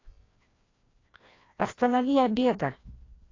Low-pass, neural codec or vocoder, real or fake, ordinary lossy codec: 7.2 kHz; codec, 16 kHz, 1 kbps, FreqCodec, larger model; fake; AAC, 32 kbps